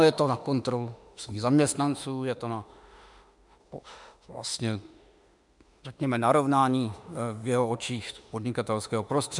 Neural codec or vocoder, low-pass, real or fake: autoencoder, 48 kHz, 32 numbers a frame, DAC-VAE, trained on Japanese speech; 10.8 kHz; fake